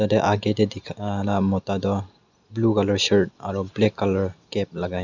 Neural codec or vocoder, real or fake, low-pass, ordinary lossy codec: none; real; 7.2 kHz; none